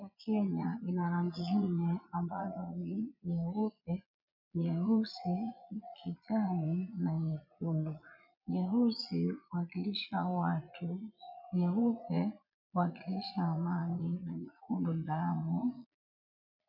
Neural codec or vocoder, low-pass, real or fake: vocoder, 22.05 kHz, 80 mel bands, Vocos; 5.4 kHz; fake